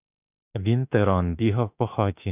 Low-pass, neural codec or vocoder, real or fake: 3.6 kHz; autoencoder, 48 kHz, 32 numbers a frame, DAC-VAE, trained on Japanese speech; fake